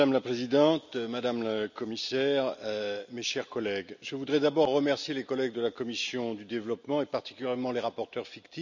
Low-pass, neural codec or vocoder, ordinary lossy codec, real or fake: 7.2 kHz; none; none; real